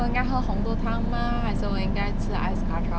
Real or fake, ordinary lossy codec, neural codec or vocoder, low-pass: real; none; none; none